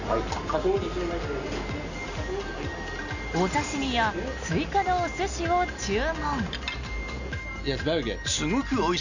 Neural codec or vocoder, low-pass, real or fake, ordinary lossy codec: none; 7.2 kHz; real; none